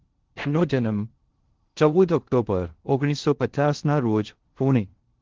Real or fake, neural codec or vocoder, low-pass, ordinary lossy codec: fake; codec, 16 kHz in and 24 kHz out, 0.6 kbps, FocalCodec, streaming, 4096 codes; 7.2 kHz; Opus, 16 kbps